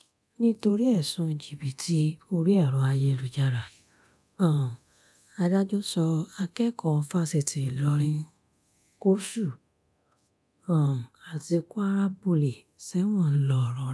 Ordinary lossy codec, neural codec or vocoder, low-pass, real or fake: none; codec, 24 kHz, 0.9 kbps, DualCodec; none; fake